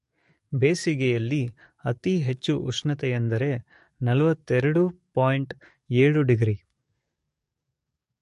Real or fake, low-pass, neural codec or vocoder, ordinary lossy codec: fake; 14.4 kHz; codec, 44.1 kHz, 7.8 kbps, DAC; MP3, 48 kbps